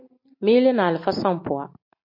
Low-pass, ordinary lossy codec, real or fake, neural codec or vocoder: 5.4 kHz; MP3, 32 kbps; real; none